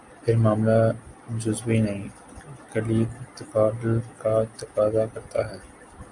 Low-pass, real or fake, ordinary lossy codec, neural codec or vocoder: 10.8 kHz; real; Opus, 64 kbps; none